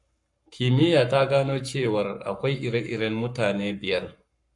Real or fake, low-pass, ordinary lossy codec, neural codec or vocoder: fake; 10.8 kHz; AAC, 64 kbps; codec, 44.1 kHz, 7.8 kbps, Pupu-Codec